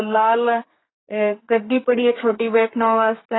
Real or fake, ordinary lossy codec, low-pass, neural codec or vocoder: fake; AAC, 16 kbps; 7.2 kHz; codec, 32 kHz, 1.9 kbps, SNAC